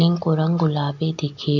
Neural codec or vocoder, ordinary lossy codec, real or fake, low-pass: none; none; real; 7.2 kHz